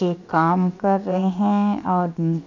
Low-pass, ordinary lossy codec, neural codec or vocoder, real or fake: 7.2 kHz; none; codec, 16 kHz, 0.7 kbps, FocalCodec; fake